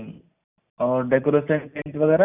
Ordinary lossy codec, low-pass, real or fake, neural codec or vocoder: none; 3.6 kHz; real; none